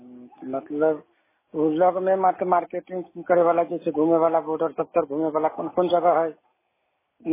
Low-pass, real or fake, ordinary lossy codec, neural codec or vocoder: 3.6 kHz; real; MP3, 16 kbps; none